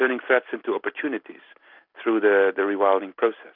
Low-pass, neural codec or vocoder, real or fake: 5.4 kHz; none; real